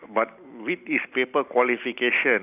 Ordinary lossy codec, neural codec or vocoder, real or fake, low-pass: none; none; real; 3.6 kHz